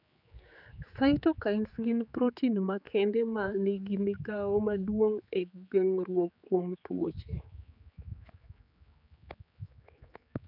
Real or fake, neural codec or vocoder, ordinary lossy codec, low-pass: fake; codec, 16 kHz, 4 kbps, X-Codec, HuBERT features, trained on general audio; none; 5.4 kHz